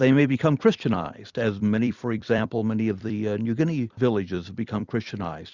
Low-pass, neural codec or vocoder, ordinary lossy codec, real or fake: 7.2 kHz; vocoder, 44.1 kHz, 128 mel bands every 256 samples, BigVGAN v2; Opus, 64 kbps; fake